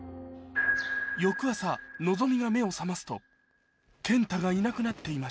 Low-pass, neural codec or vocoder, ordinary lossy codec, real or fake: none; none; none; real